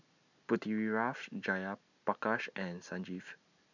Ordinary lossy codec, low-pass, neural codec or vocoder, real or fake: none; 7.2 kHz; none; real